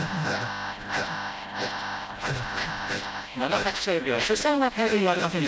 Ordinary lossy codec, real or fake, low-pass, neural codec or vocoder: none; fake; none; codec, 16 kHz, 0.5 kbps, FreqCodec, smaller model